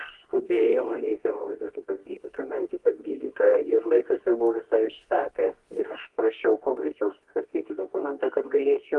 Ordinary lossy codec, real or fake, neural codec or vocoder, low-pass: Opus, 24 kbps; fake; codec, 24 kHz, 0.9 kbps, WavTokenizer, medium music audio release; 10.8 kHz